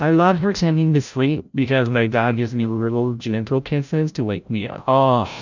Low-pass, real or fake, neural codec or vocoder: 7.2 kHz; fake; codec, 16 kHz, 0.5 kbps, FreqCodec, larger model